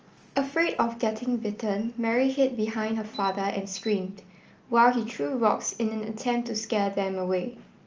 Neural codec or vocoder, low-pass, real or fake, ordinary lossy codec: none; 7.2 kHz; real; Opus, 24 kbps